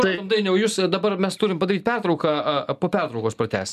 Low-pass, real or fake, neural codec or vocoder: 14.4 kHz; real; none